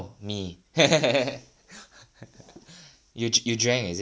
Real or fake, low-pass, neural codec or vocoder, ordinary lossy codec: real; none; none; none